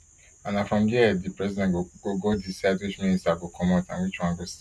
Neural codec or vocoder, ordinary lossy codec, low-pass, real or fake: none; none; none; real